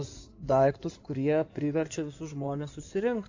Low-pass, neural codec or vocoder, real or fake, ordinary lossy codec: 7.2 kHz; codec, 16 kHz in and 24 kHz out, 2.2 kbps, FireRedTTS-2 codec; fake; AAC, 48 kbps